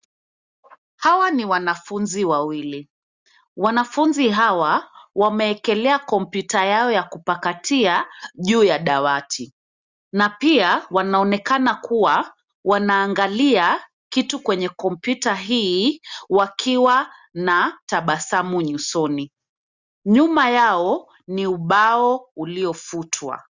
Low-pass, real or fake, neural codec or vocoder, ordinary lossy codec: 7.2 kHz; real; none; Opus, 64 kbps